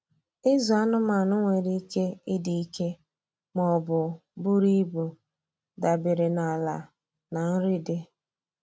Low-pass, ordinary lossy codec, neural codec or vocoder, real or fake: none; none; none; real